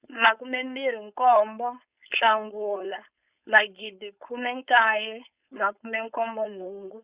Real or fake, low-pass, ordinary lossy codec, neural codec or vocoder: fake; 3.6 kHz; Opus, 64 kbps; codec, 16 kHz, 4.8 kbps, FACodec